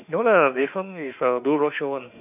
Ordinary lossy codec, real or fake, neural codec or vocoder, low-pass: none; fake; autoencoder, 48 kHz, 32 numbers a frame, DAC-VAE, trained on Japanese speech; 3.6 kHz